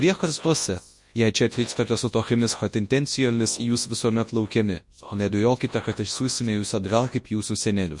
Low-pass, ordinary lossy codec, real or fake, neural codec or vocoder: 10.8 kHz; MP3, 48 kbps; fake; codec, 24 kHz, 0.9 kbps, WavTokenizer, large speech release